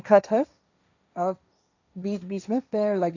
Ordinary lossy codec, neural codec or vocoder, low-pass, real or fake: none; codec, 16 kHz, 1.1 kbps, Voila-Tokenizer; 7.2 kHz; fake